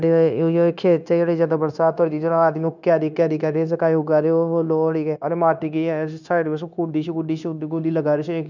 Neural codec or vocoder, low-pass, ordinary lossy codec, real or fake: codec, 16 kHz, 0.9 kbps, LongCat-Audio-Codec; 7.2 kHz; none; fake